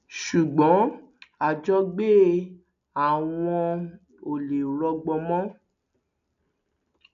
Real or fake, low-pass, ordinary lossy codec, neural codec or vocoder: real; 7.2 kHz; none; none